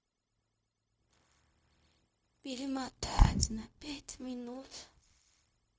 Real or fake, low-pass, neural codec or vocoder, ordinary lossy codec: fake; none; codec, 16 kHz, 0.4 kbps, LongCat-Audio-Codec; none